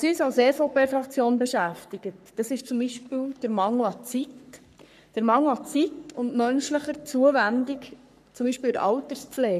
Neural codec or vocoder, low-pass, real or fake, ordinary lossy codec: codec, 44.1 kHz, 3.4 kbps, Pupu-Codec; 14.4 kHz; fake; none